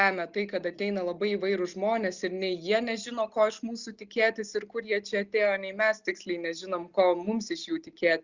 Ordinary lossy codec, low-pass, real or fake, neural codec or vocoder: Opus, 64 kbps; 7.2 kHz; real; none